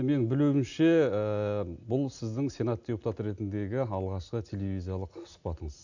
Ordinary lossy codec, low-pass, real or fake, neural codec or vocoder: none; 7.2 kHz; real; none